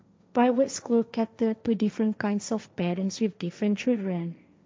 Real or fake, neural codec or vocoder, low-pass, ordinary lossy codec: fake; codec, 16 kHz, 1.1 kbps, Voila-Tokenizer; none; none